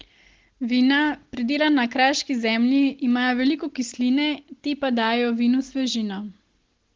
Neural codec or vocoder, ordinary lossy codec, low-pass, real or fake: none; Opus, 16 kbps; 7.2 kHz; real